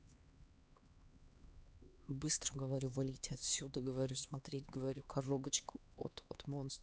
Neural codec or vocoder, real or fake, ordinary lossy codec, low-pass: codec, 16 kHz, 2 kbps, X-Codec, HuBERT features, trained on LibriSpeech; fake; none; none